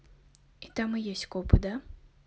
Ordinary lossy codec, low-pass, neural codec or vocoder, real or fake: none; none; none; real